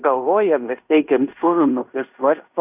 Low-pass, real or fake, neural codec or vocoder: 3.6 kHz; fake; codec, 16 kHz in and 24 kHz out, 0.9 kbps, LongCat-Audio-Codec, fine tuned four codebook decoder